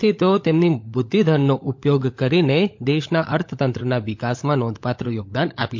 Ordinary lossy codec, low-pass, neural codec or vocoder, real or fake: MP3, 48 kbps; 7.2 kHz; codec, 16 kHz, 16 kbps, FunCodec, trained on LibriTTS, 50 frames a second; fake